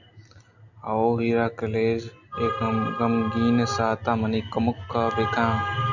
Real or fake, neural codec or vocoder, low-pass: real; none; 7.2 kHz